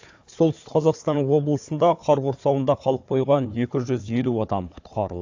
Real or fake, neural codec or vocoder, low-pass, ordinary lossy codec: fake; codec, 16 kHz, 4 kbps, FunCodec, trained on Chinese and English, 50 frames a second; 7.2 kHz; AAC, 48 kbps